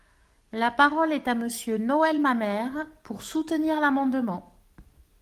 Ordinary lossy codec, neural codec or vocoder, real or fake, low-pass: Opus, 32 kbps; codec, 44.1 kHz, 7.8 kbps, DAC; fake; 14.4 kHz